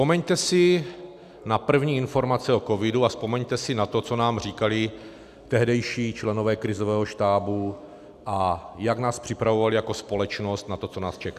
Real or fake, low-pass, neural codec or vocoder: real; 14.4 kHz; none